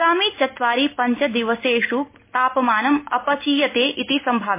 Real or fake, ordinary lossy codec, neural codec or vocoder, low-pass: real; MP3, 24 kbps; none; 3.6 kHz